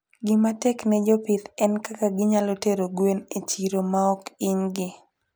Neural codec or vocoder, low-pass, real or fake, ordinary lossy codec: none; none; real; none